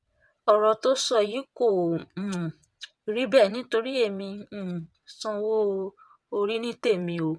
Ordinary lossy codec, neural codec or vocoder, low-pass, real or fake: none; vocoder, 22.05 kHz, 80 mel bands, WaveNeXt; none; fake